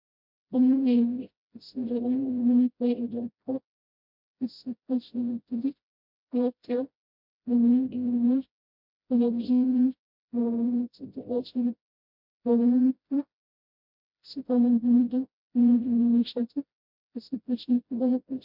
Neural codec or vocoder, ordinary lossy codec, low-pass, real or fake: codec, 16 kHz, 0.5 kbps, FreqCodec, smaller model; MP3, 48 kbps; 5.4 kHz; fake